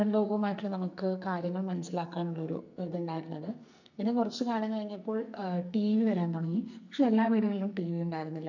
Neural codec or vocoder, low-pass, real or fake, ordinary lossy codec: codec, 44.1 kHz, 2.6 kbps, SNAC; 7.2 kHz; fake; none